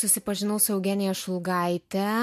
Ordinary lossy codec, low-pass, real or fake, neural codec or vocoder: MP3, 64 kbps; 14.4 kHz; real; none